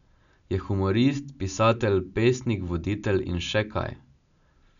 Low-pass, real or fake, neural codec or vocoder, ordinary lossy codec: 7.2 kHz; real; none; none